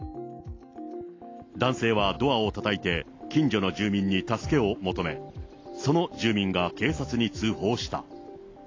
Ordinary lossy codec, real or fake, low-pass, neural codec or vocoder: AAC, 32 kbps; real; 7.2 kHz; none